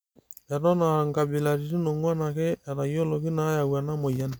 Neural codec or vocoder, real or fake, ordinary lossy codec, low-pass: none; real; none; none